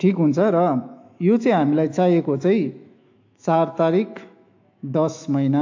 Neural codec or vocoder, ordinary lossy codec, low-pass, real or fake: autoencoder, 48 kHz, 128 numbers a frame, DAC-VAE, trained on Japanese speech; AAC, 48 kbps; 7.2 kHz; fake